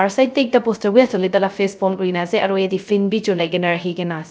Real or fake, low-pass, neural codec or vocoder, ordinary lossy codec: fake; none; codec, 16 kHz, 0.3 kbps, FocalCodec; none